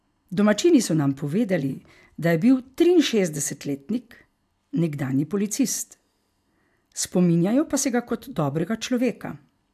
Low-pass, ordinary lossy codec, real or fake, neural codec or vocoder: 14.4 kHz; AAC, 96 kbps; real; none